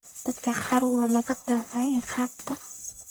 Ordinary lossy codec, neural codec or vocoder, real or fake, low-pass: none; codec, 44.1 kHz, 1.7 kbps, Pupu-Codec; fake; none